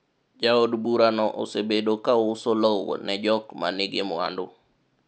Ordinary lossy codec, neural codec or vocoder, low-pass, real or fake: none; none; none; real